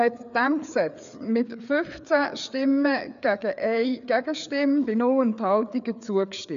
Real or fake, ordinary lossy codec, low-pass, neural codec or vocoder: fake; none; 7.2 kHz; codec, 16 kHz, 4 kbps, FreqCodec, larger model